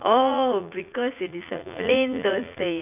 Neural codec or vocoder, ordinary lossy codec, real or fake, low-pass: vocoder, 44.1 kHz, 80 mel bands, Vocos; none; fake; 3.6 kHz